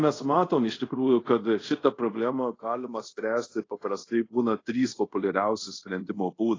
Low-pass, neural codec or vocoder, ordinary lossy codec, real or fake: 7.2 kHz; codec, 24 kHz, 0.5 kbps, DualCodec; AAC, 32 kbps; fake